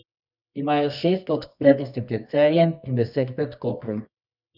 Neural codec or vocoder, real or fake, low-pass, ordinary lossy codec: codec, 24 kHz, 0.9 kbps, WavTokenizer, medium music audio release; fake; 5.4 kHz; none